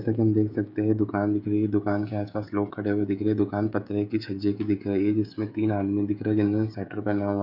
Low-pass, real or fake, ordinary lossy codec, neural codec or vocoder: 5.4 kHz; fake; none; codec, 16 kHz, 16 kbps, FreqCodec, smaller model